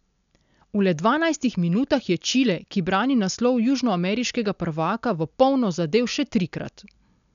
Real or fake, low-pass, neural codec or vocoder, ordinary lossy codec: real; 7.2 kHz; none; none